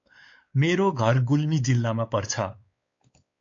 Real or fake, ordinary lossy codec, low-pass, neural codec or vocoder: fake; AAC, 48 kbps; 7.2 kHz; codec, 16 kHz, 4 kbps, X-Codec, WavLM features, trained on Multilingual LibriSpeech